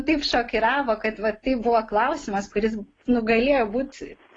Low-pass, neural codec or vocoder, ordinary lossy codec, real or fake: 9.9 kHz; none; AAC, 32 kbps; real